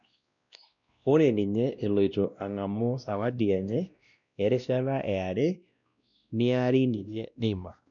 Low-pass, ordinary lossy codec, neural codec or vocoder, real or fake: 7.2 kHz; none; codec, 16 kHz, 1 kbps, X-Codec, WavLM features, trained on Multilingual LibriSpeech; fake